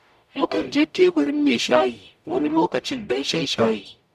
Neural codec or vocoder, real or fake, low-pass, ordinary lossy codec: codec, 44.1 kHz, 0.9 kbps, DAC; fake; 14.4 kHz; none